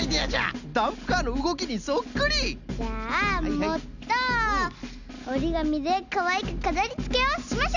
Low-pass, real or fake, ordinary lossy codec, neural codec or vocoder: 7.2 kHz; real; none; none